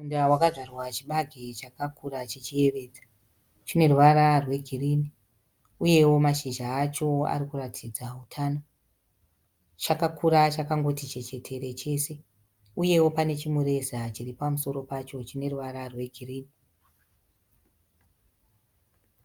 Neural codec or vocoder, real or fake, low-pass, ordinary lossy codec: none; real; 19.8 kHz; Opus, 32 kbps